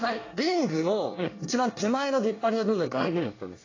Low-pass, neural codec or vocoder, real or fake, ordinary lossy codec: 7.2 kHz; codec, 24 kHz, 1 kbps, SNAC; fake; AAC, 32 kbps